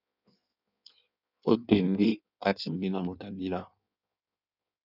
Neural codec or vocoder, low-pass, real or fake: codec, 16 kHz in and 24 kHz out, 1.1 kbps, FireRedTTS-2 codec; 5.4 kHz; fake